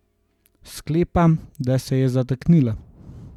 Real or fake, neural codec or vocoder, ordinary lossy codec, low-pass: real; none; none; 19.8 kHz